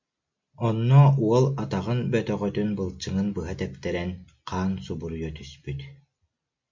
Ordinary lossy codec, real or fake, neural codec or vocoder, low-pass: MP3, 48 kbps; real; none; 7.2 kHz